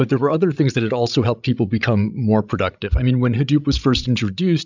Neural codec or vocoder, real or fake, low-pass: codec, 16 kHz, 16 kbps, FreqCodec, larger model; fake; 7.2 kHz